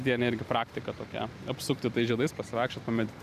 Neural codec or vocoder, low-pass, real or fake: vocoder, 44.1 kHz, 128 mel bands every 512 samples, BigVGAN v2; 14.4 kHz; fake